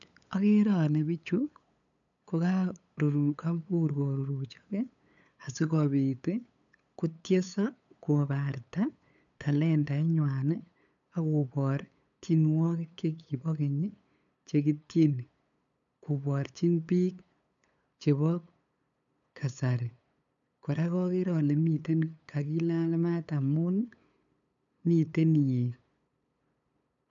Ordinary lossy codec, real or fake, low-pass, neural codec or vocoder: none; fake; 7.2 kHz; codec, 16 kHz, 8 kbps, FunCodec, trained on LibriTTS, 25 frames a second